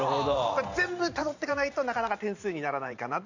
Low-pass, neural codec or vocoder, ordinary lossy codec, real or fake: 7.2 kHz; vocoder, 44.1 kHz, 128 mel bands every 512 samples, BigVGAN v2; none; fake